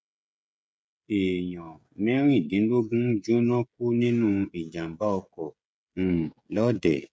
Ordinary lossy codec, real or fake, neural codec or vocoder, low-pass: none; fake; codec, 16 kHz, 16 kbps, FreqCodec, smaller model; none